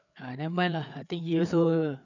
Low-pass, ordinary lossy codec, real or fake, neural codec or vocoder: 7.2 kHz; none; fake; codec, 16 kHz, 4 kbps, FreqCodec, larger model